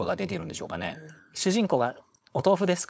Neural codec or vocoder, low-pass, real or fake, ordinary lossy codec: codec, 16 kHz, 4.8 kbps, FACodec; none; fake; none